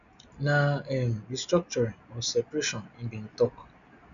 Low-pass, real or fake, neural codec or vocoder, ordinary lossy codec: 7.2 kHz; real; none; none